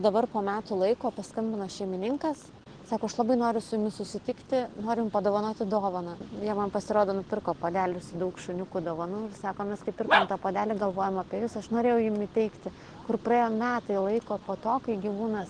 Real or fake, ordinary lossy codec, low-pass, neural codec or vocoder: real; Opus, 16 kbps; 9.9 kHz; none